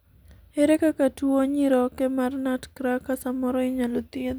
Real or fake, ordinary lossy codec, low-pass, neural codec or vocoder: real; none; none; none